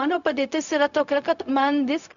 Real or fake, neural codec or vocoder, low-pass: fake; codec, 16 kHz, 0.4 kbps, LongCat-Audio-Codec; 7.2 kHz